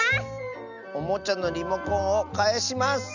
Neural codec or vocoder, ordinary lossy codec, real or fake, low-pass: none; none; real; 7.2 kHz